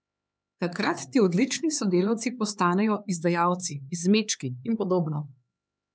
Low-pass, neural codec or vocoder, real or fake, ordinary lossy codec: none; codec, 16 kHz, 4 kbps, X-Codec, HuBERT features, trained on LibriSpeech; fake; none